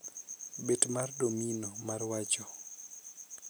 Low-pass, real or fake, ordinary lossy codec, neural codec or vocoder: none; real; none; none